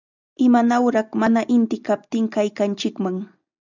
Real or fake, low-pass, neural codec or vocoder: real; 7.2 kHz; none